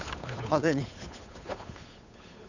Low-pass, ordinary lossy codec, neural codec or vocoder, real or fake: 7.2 kHz; none; codec, 16 kHz, 8 kbps, FunCodec, trained on Chinese and English, 25 frames a second; fake